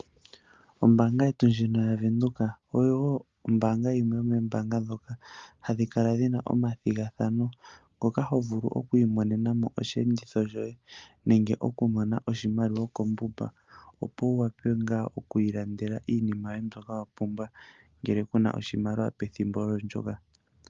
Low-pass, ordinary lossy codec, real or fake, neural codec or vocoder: 7.2 kHz; Opus, 32 kbps; real; none